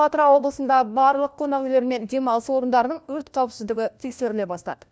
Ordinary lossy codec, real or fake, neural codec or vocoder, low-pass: none; fake; codec, 16 kHz, 1 kbps, FunCodec, trained on LibriTTS, 50 frames a second; none